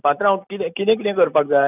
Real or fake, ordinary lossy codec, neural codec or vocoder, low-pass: real; none; none; 3.6 kHz